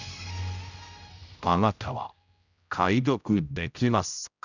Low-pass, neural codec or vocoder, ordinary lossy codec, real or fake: 7.2 kHz; codec, 16 kHz, 0.5 kbps, X-Codec, HuBERT features, trained on general audio; none; fake